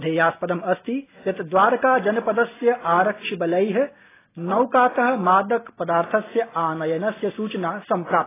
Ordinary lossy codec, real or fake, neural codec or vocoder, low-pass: AAC, 16 kbps; real; none; 3.6 kHz